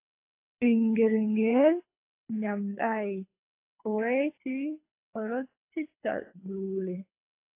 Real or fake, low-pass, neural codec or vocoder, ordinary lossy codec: fake; 3.6 kHz; codec, 24 kHz, 3 kbps, HILCodec; AAC, 24 kbps